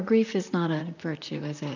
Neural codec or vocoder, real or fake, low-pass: vocoder, 44.1 kHz, 128 mel bands, Pupu-Vocoder; fake; 7.2 kHz